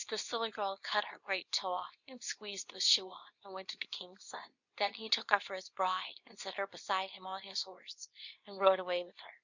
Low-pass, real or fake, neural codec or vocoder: 7.2 kHz; fake; codec, 24 kHz, 0.9 kbps, WavTokenizer, medium speech release version 2